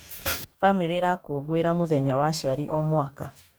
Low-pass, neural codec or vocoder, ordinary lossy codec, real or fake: none; codec, 44.1 kHz, 2.6 kbps, DAC; none; fake